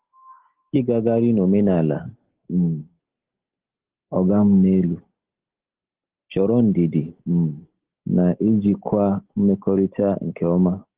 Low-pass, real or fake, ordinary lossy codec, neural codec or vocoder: 3.6 kHz; real; Opus, 16 kbps; none